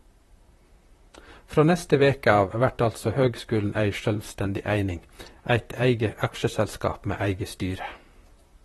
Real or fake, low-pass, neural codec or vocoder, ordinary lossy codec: fake; 19.8 kHz; vocoder, 44.1 kHz, 128 mel bands, Pupu-Vocoder; AAC, 32 kbps